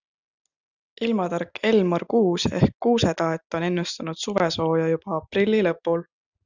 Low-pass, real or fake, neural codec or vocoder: 7.2 kHz; real; none